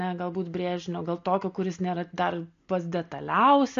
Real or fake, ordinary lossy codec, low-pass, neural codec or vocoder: real; MP3, 48 kbps; 7.2 kHz; none